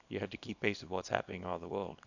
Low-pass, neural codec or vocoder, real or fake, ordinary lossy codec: 7.2 kHz; codec, 24 kHz, 0.9 kbps, WavTokenizer, small release; fake; none